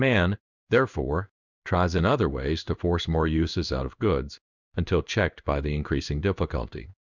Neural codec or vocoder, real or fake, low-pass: codec, 16 kHz in and 24 kHz out, 1 kbps, XY-Tokenizer; fake; 7.2 kHz